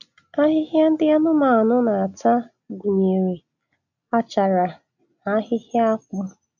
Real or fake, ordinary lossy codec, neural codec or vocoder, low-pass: real; none; none; 7.2 kHz